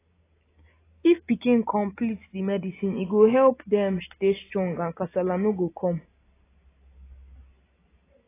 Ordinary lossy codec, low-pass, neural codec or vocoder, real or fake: AAC, 16 kbps; 3.6 kHz; none; real